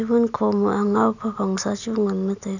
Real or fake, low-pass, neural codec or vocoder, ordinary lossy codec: real; 7.2 kHz; none; none